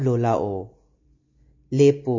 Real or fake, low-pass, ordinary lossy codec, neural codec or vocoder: real; 7.2 kHz; MP3, 32 kbps; none